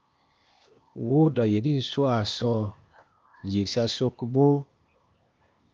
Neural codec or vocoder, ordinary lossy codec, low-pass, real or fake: codec, 16 kHz, 0.8 kbps, ZipCodec; Opus, 24 kbps; 7.2 kHz; fake